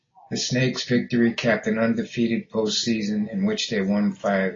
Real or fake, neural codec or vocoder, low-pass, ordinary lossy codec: real; none; 7.2 kHz; AAC, 32 kbps